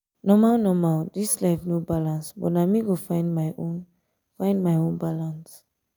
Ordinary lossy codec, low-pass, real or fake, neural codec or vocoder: none; none; real; none